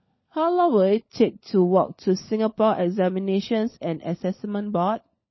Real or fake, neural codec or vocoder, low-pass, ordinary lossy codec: fake; codec, 16 kHz, 16 kbps, FunCodec, trained on LibriTTS, 50 frames a second; 7.2 kHz; MP3, 24 kbps